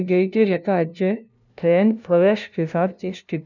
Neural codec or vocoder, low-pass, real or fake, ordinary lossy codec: codec, 16 kHz, 0.5 kbps, FunCodec, trained on LibriTTS, 25 frames a second; 7.2 kHz; fake; none